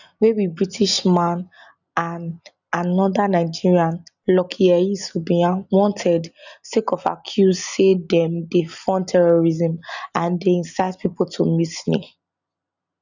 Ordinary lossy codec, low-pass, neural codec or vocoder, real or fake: none; 7.2 kHz; none; real